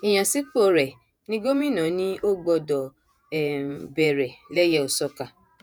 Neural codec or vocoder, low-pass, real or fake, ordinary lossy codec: vocoder, 48 kHz, 128 mel bands, Vocos; none; fake; none